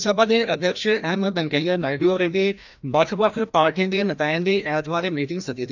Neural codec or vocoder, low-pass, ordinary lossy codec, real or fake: codec, 16 kHz, 1 kbps, FreqCodec, larger model; 7.2 kHz; none; fake